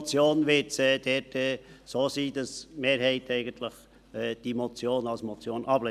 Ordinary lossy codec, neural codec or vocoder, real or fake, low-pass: none; none; real; 14.4 kHz